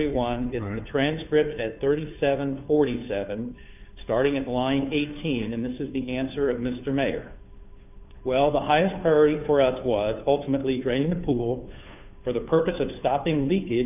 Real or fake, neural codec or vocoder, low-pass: fake; codec, 16 kHz, 2 kbps, FunCodec, trained on Chinese and English, 25 frames a second; 3.6 kHz